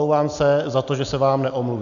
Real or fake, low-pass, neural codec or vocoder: real; 7.2 kHz; none